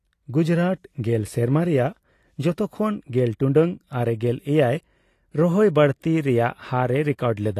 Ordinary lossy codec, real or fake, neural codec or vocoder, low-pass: AAC, 48 kbps; real; none; 14.4 kHz